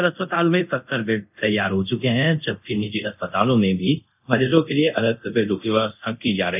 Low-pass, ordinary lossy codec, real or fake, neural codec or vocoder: 3.6 kHz; none; fake; codec, 24 kHz, 0.5 kbps, DualCodec